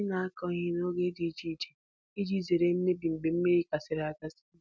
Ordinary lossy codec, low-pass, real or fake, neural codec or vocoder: none; none; real; none